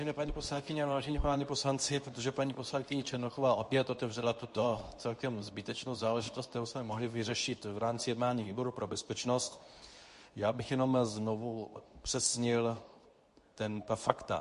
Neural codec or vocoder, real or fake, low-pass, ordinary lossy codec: codec, 24 kHz, 0.9 kbps, WavTokenizer, medium speech release version 2; fake; 10.8 kHz; MP3, 48 kbps